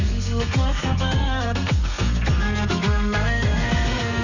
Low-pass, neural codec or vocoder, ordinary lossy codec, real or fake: 7.2 kHz; codec, 32 kHz, 1.9 kbps, SNAC; none; fake